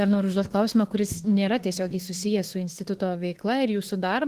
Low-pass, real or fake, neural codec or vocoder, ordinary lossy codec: 14.4 kHz; fake; autoencoder, 48 kHz, 32 numbers a frame, DAC-VAE, trained on Japanese speech; Opus, 16 kbps